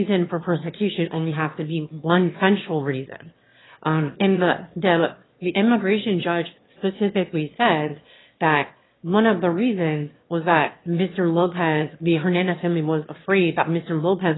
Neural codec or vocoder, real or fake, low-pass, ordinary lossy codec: autoencoder, 22.05 kHz, a latent of 192 numbers a frame, VITS, trained on one speaker; fake; 7.2 kHz; AAC, 16 kbps